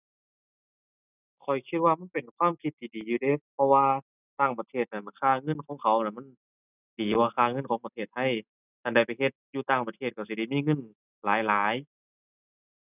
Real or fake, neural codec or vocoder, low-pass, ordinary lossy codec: real; none; 3.6 kHz; none